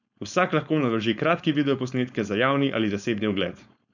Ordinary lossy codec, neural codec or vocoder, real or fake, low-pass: none; codec, 16 kHz, 4.8 kbps, FACodec; fake; 7.2 kHz